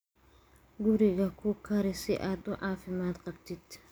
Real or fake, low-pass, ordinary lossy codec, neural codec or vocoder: real; none; none; none